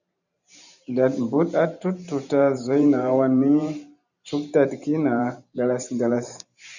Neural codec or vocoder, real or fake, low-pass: vocoder, 44.1 kHz, 128 mel bands every 512 samples, BigVGAN v2; fake; 7.2 kHz